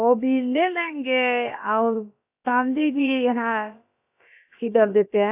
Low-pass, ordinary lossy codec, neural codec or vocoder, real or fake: 3.6 kHz; AAC, 32 kbps; codec, 16 kHz, about 1 kbps, DyCAST, with the encoder's durations; fake